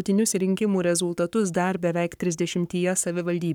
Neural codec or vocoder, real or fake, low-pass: codec, 44.1 kHz, 7.8 kbps, Pupu-Codec; fake; 19.8 kHz